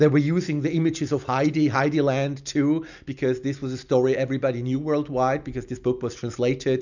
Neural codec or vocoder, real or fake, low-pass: none; real; 7.2 kHz